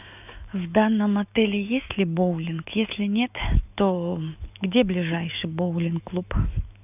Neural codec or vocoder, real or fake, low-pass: autoencoder, 48 kHz, 128 numbers a frame, DAC-VAE, trained on Japanese speech; fake; 3.6 kHz